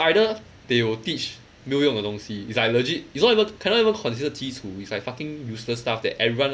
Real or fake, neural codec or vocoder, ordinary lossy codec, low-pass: real; none; none; none